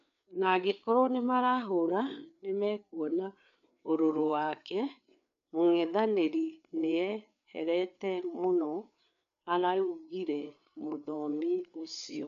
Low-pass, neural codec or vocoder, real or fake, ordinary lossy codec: 7.2 kHz; codec, 16 kHz, 4 kbps, FreqCodec, larger model; fake; none